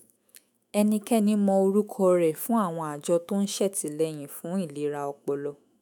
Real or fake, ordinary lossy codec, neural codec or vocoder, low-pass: fake; none; autoencoder, 48 kHz, 128 numbers a frame, DAC-VAE, trained on Japanese speech; none